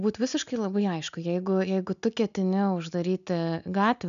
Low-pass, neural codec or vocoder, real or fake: 7.2 kHz; none; real